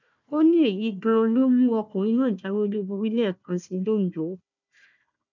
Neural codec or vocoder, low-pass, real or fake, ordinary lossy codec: codec, 16 kHz, 1 kbps, FunCodec, trained on Chinese and English, 50 frames a second; 7.2 kHz; fake; none